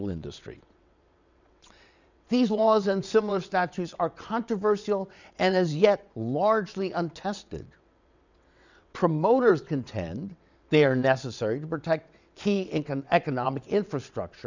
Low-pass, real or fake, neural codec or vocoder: 7.2 kHz; fake; vocoder, 22.05 kHz, 80 mel bands, WaveNeXt